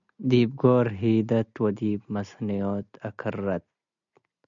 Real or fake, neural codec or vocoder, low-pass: real; none; 7.2 kHz